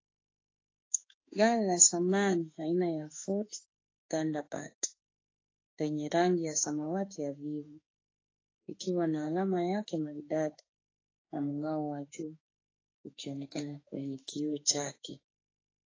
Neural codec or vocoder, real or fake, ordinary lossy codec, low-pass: autoencoder, 48 kHz, 32 numbers a frame, DAC-VAE, trained on Japanese speech; fake; AAC, 32 kbps; 7.2 kHz